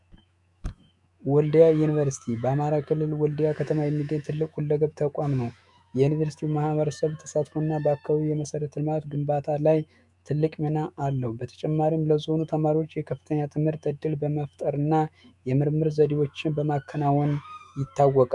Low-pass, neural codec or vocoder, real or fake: 10.8 kHz; autoencoder, 48 kHz, 128 numbers a frame, DAC-VAE, trained on Japanese speech; fake